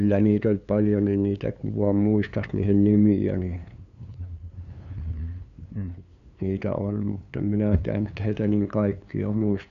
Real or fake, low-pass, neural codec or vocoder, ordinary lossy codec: fake; 7.2 kHz; codec, 16 kHz, 2 kbps, FunCodec, trained on LibriTTS, 25 frames a second; none